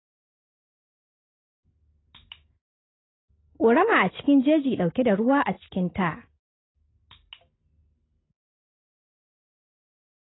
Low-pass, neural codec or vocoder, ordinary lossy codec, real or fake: 7.2 kHz; none; AAC, 16 kbps; real